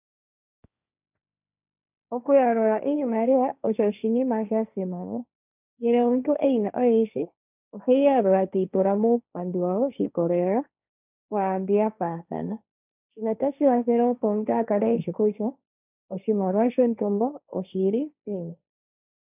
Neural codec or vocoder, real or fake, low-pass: codec, 16 kHz, 1.1 kbps, Voila-Tokenizer; fake; 3.6 kHz